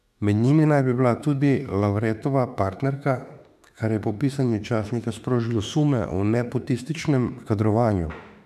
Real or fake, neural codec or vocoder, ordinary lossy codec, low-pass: fake; autoencoder, 48 kHz, 32 numbers a frame, DAC-VAE, trained on Japanese speech; none; 14.4 kHz